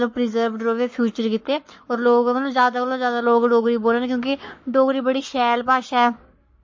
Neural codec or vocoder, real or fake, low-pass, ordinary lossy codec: codec, 44.1 kHz, 7.8 kbps, Pupu-Codec; fake; 7.2 kHz; MP3, 32 kbps